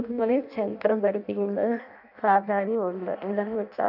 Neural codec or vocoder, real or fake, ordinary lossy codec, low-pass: codec, 16 kHz in and 24 kHz out, 0.6 kbps, FireRedTTS-2 codec; fake; none; 5.4 kHz